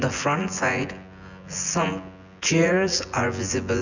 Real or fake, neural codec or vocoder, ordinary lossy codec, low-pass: fake; vocoder, 24 kHz, 100 mel bands, Vocos; none; 7.2 kHz